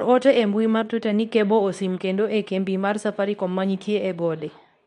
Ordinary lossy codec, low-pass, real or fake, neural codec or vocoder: none; 10.8 kHz; fake; codec, 24 kHz, 0.9 kbps, WavTokenizer, medium speech release version 2